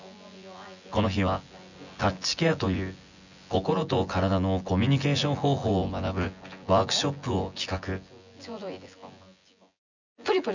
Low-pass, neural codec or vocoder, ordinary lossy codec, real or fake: 7.2 kHz; vocoder, 24 kHz, 100 mel bands, Vocos; none; fake